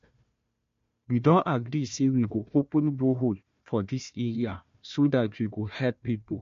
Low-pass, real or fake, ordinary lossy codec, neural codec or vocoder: 7.2 kHz; fake; MP3, 48 kbps; codec, 16 kHz, 1 kbps, FunCodec, trained on Chinese and English, 50 frames a second